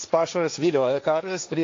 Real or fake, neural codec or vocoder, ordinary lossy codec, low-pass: fake; codec, 16 kHz, 1.1 kbps, Voila-Tokenizer; AAC, 48 kbps; 7.2 kHz